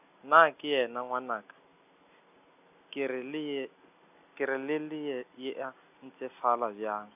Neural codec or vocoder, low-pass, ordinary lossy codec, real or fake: none; 3.6 kHz; none; real